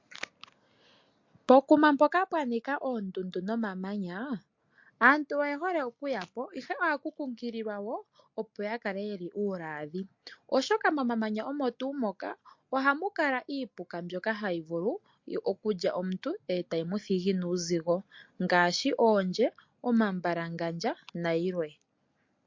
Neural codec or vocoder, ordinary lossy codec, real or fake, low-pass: none; MP3, 48 kbps; real; 7.2 kHz